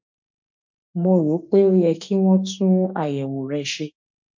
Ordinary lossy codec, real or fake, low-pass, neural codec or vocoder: MP3, 48 kbps; fake; 7.2 kHz; autoencoder, 48 kHz, 32 numbers a frame, DAC-VAE, trained on Japanese speech